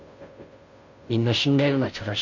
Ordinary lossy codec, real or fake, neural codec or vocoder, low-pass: MP3, 48 kbps; fake; codec, 16 kHz, 0.5 kbps, FunCodec, trained on Chinese and English, 25 frames a second; 7.2 kHz